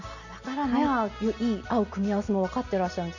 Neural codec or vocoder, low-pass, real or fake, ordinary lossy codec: none; 7.2 kHz; real; none